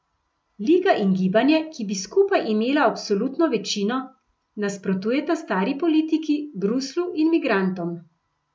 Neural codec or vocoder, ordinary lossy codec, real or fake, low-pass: none; none; real; none